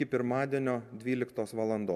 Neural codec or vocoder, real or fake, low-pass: none; real; 14.4 kHz